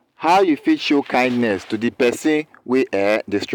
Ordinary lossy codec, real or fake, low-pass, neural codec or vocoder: none; real; 19.8 kHz; none